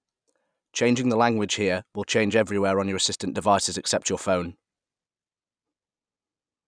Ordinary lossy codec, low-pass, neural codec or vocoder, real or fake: none; 9.9 kHz; none; real